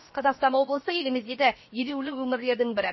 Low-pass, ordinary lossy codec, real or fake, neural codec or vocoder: 7.2 kHz; MP3, 24 kbps; fake; codec, 16 kHz, 0.7 kbps, FocalCodec